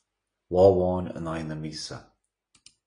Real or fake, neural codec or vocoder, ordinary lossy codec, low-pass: real; none; AAC, 32 kbps; 9.9 kHz